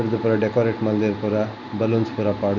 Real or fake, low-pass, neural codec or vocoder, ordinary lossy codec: real; 7.2 kHz; none; none